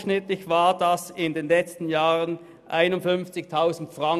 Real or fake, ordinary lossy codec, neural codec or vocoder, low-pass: real; none; none; 14.4 kHz